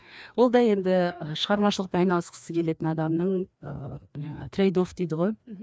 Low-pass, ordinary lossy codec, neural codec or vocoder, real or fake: none; none; codec, 16 kHz, 2 kbps, FreqCodec, larger model; fake